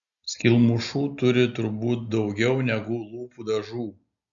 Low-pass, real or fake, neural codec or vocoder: 7.2 kHz; real; none